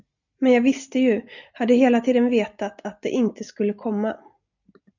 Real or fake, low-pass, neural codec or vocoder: real; 7.2 kHz; none